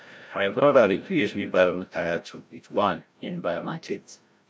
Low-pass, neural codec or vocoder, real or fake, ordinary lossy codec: none; codec, 16 kHz, 0.5 kbps, FreqCodec, larger model; fake; none